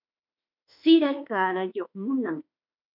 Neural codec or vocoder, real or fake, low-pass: autoencoder, 48 kHz, 32 numbers a frame, DAC-VAE, trained on Japanese speech; fake; 5.4 kHz